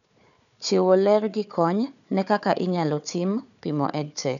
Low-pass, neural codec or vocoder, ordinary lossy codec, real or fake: 7.2 kHz; codec, 16 kHz, 4 kbps, FunCodec, trained on Chinese and English, 50 frames a second; none; fake